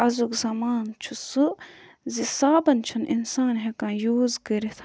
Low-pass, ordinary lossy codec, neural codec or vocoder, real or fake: none; none; none; real